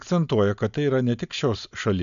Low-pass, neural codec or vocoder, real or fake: 7.2 kHz; none; real